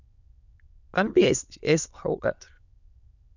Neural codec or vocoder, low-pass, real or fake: autoencoder, 22.05 kHz, a latent of 192 numbers a frame, VITS, trained on many speakers; 7.2 kHz; fake